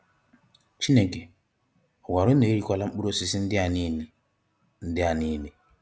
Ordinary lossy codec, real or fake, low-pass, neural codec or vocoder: none; real; none; none